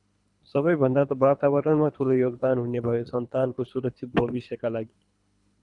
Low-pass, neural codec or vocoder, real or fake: 10.8 kHz; codec, 24 kHz, 3 kbps, HILCodec; fake